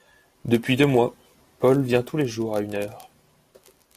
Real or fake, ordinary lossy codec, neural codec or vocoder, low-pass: real; AAC, 64 kbps; none; 14.4 kHz